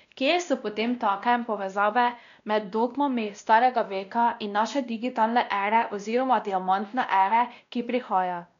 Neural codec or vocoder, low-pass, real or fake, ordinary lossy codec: codec, 16 kHz, 1 kbps, X-Codec, WavLM features, trained on Multilingual LibriSpeech; 7.2 kHz; fake; none